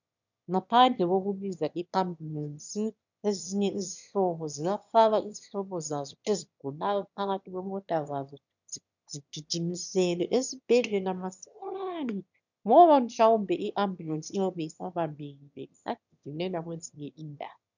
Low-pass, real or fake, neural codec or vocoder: 7.2 kHz; fake; autoencoder, 22.05 kHz, a latent of 192 numbers a frame, VITS, trained on one speaker